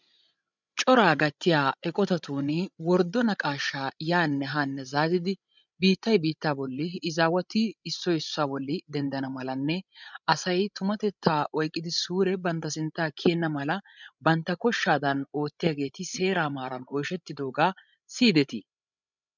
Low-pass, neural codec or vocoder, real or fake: 7.2 kHz; codec, 16 kHz, 16 kbps, FreqCodec, larger model; fake